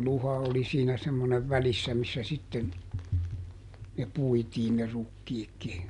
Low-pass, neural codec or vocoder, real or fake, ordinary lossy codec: 10.8 kHz; none; real; MP3, 96 kbps